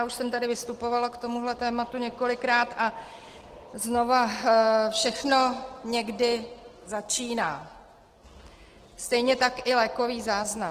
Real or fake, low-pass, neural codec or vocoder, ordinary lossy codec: real; 14.4 kHz; none; Opus, 16 kbps